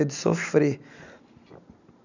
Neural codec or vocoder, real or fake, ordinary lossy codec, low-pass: none; real; none; 7.2 kHz